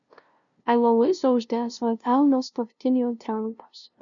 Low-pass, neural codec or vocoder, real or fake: 7.2 kHz; codec, 16 kHz, 0.5 kbps, FunCodec, trained on LibriTTS, 25 frames a second; fake